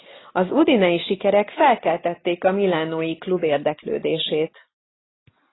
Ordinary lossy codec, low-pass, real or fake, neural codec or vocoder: AAC, 16 kbps; 7.2 kHz; real; none